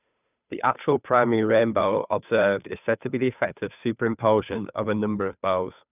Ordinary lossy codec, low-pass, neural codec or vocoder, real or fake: none; 3.6 kHz; codec, 16 kHz, 2 kbps, FunCodec, trained on Chinese and English, 25 frames a second; fake